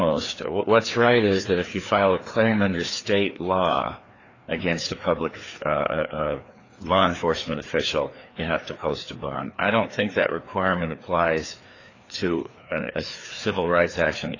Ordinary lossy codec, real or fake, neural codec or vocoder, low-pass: AAC, 32 kbps; fake; codec, 16 kHz, 2 kbps, FreqCodec, larger model; 7.2 kHz